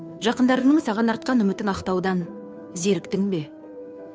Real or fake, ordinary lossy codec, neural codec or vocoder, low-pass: fake; none; codec, 16 kHz, 2 kbps, FunCodec, trained on Chinese and English, 25 frames a second; none